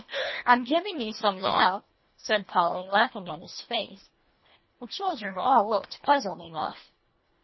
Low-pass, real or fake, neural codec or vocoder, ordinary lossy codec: 7.2 kHz; fake; codec, 24 kHz, 1.5 kbps, HILCodec; MP3, 24 kbps